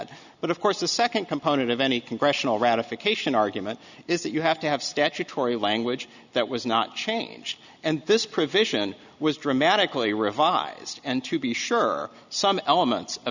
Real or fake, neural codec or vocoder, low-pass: real; none; 7.2 kHz